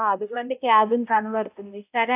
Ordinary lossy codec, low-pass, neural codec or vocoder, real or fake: AAC, 32 kbps; 3.6 kHz; codec, 16 kHz, 0.5 kbps, X-Codec, HuBERT features, trained on balanced general audio; fake